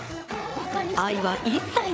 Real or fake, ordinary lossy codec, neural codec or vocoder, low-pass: fake; none; codec, 16 kHz, 8 kbps, FreqCodec, larger model; none